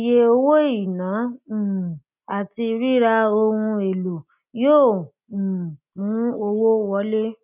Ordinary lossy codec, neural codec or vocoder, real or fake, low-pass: none; none; real; 3.6 kHz